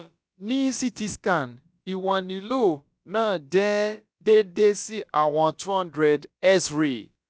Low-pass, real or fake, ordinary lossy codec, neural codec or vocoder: none; fake; none; codec, 16 kHz, about 1 kbps, DyCAST, with the encoder's durations